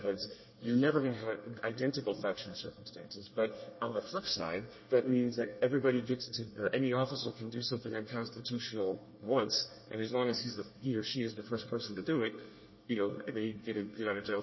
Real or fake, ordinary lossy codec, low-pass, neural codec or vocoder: fake; MP3, 24 kbps; 7.2 kHz; codec, 24 kHz, 1 kbps, SNAC